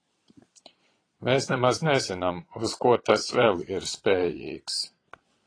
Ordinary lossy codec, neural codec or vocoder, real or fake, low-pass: AAC, 32 kbps; vocoder, 22.05 kHz, 80 mel bands, Vocos; fake; 9.9 kHz